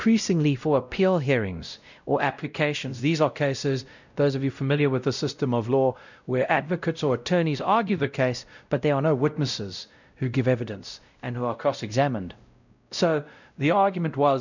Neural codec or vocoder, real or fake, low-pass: codec, 16 kHz, 0.5 kbps, X-Codec, WavLM features, trained on Multilingual LibriSpeech; fake; 7.2 kHz